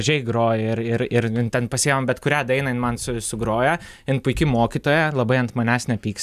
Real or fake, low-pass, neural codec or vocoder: real; 14.4 kHz; none